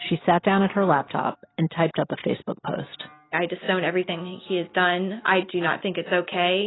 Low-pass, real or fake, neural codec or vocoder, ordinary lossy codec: 7.2 kHz; real; none; AAC, 16 kbps